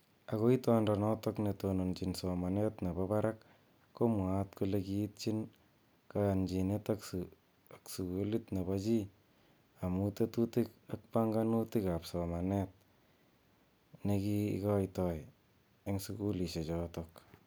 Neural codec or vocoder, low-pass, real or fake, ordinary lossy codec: none; none; real; none